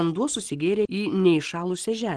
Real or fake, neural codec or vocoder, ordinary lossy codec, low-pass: real; none; Opus, 16 kbps; 9.9 kHz